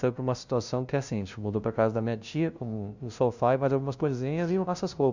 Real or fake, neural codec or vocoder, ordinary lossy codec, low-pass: fake; codec, 16 kHz, 0.5 kbps, FunCodec, trained on LibriTTS, 25 frames a second; Opus, 64 kbps; 7.2 kHz